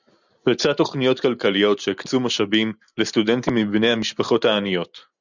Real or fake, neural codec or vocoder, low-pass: real; none; 7.2 kHz